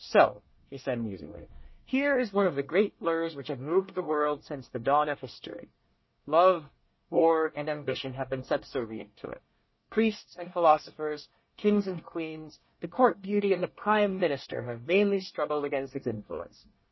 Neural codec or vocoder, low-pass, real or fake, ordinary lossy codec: codec, 24 kHz, 1 kbps, SNAC; 7.2 kHz; fake; MP3, 24 kbps